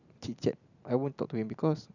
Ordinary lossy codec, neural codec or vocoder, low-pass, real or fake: none; none; 7.2 kHz; real